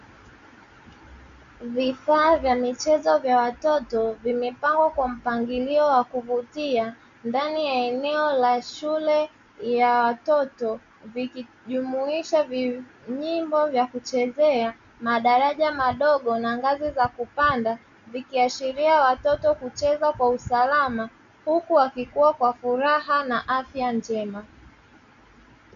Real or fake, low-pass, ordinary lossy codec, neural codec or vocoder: real; 7.2 kHz; MP3, 64 kbps; none